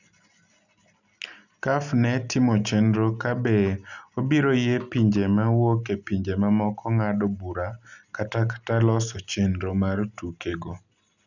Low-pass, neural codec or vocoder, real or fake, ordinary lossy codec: 7.2 kHz; none; real; none